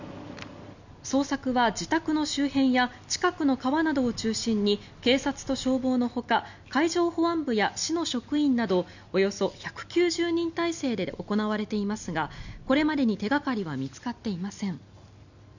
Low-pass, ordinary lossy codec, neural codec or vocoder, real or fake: 7.2 kHz; none; none; real